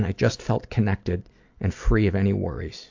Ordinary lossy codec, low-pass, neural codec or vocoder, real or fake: AAC, 48 kbps; 7.2 kHz; none; real